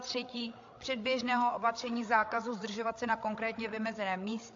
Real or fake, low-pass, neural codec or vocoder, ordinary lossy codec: fake; 7.2 kHz; codec, 16 kHz, 8 kbps, FreqCodec, larger model; AAC, 48 kbps